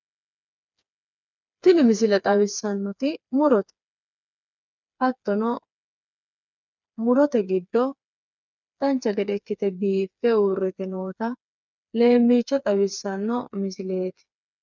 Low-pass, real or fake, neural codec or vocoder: 7.2 kHz; fake; codec, 16 kHz, 4 kbps, FreqCodec, smaller model